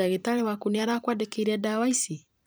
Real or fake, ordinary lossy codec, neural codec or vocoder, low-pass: fake; none; vocoder, 44.1 kHz, 128 mel bands every 256 samples, BigVGAN v2; none